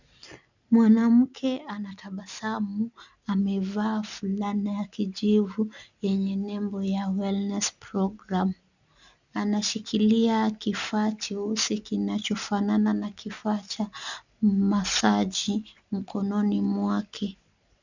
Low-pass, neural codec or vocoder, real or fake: 7.2 kHz; none; real